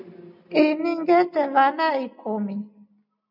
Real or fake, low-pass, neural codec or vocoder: real; 5.4 kHz; none